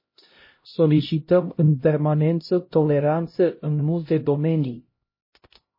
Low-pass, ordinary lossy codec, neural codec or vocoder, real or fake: 5.4 kHz; MP3, 24 kbps; codec, 16 kHz, 0.5 kbps, X-Codec, HuBERT features, trained on LibriSpeech; fake